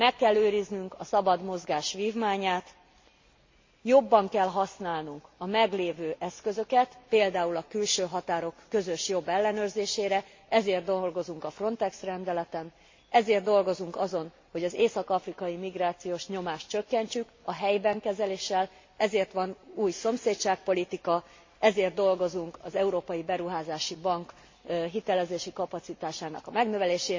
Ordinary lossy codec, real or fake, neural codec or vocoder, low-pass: MP3, 32 kbps; real; none; 7.2 kHz